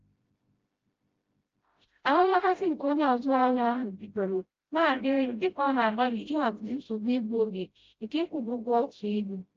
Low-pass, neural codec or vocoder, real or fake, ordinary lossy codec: 7.2 kHz; codec, 16 kHz, 0.5 kbps, FreqCodec, smaller model; fake; Opus, 24 kbps